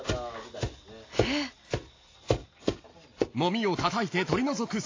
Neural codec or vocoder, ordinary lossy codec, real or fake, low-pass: none; AAC, 32 kbps; real; 7.2 kHz